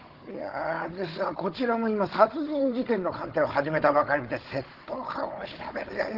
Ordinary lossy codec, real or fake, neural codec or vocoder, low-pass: Opus, 16 kbps; fake; codec, 16 kHz, 16 kbps, FunCodec, trained on Chinese and English, 50 frames a second; 5.4 kHz